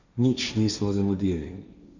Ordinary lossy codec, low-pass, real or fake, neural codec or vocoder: Opus, 64 kbps; 7.2 kHz; fake; codec, 16 kHz, 1.1 kbps, Voila-Tokenizer